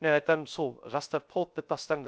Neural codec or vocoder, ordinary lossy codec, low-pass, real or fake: codec, 16 kHz, 0.3 kbps, FocalCodec; none; none; fake